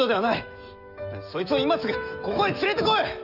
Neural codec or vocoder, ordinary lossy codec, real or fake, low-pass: autoencoder, 48 kHz, 128 numbers a frame, DAC-VAE, trained on Japanese speech; none; fake; 5.4 kHz